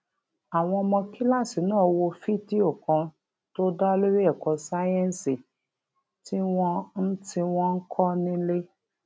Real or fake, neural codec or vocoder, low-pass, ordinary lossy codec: real; none; none; none